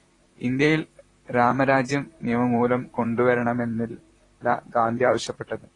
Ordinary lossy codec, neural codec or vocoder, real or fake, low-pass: AAC, 32 kbps; vocoder, 44.1 kHz, 128 mel bands, Pupu-Vocoder; fake; 10.8 kHz